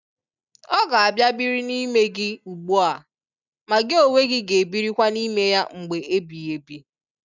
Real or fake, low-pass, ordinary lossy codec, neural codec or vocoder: real; 7.2 kHz; none; none